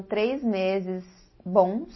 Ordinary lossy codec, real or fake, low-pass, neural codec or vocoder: MP3, 24 kbps; real; 7.2 kHz; none